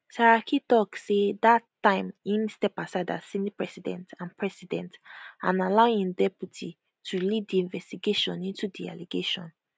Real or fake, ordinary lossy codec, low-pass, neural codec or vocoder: real; none; none; none